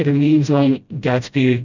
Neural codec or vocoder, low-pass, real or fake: codec, 16 kHz, 0.5 kbps, FreqCodec, smaller model; 7.2 kHz; fake